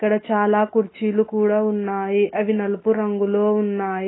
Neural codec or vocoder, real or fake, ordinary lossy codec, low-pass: none; real; AAC, 16 kbps; 7.2 kHz